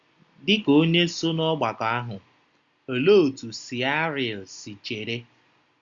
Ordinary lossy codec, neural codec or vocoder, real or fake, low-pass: Opus, 64 kbps; none; real; 7.2 kHz